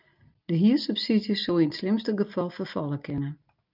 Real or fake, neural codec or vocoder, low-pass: real; none; 5.4 kHz